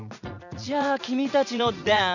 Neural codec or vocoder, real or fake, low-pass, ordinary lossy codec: vocoder, 44.1 kHz, 80 mel bands, Vocos; fake; 7.2 kHz; none